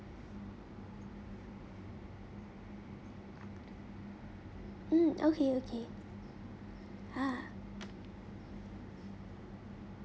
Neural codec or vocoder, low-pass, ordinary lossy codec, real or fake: none; none; none; real